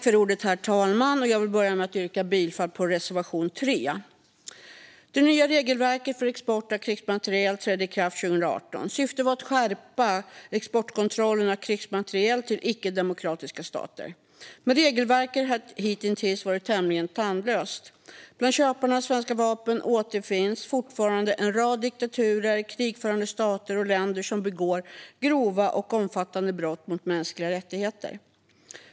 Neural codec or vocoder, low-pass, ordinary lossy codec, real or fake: none; none; none; real